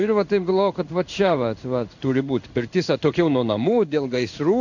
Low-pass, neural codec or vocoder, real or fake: 7.2 kHz; codec, 16 kHz in and 24 kHz out, 1 kbps, XY-Tokenizer; fake